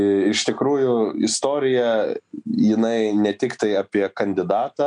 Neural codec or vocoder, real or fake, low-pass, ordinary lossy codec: none; real; 9.9 kHz; MP3, 96 kbps